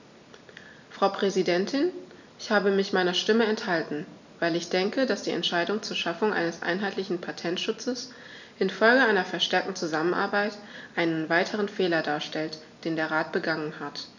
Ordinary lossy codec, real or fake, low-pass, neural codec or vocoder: none; real; 7.2 kHz; none